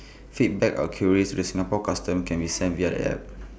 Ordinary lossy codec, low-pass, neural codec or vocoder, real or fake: none; none; none; real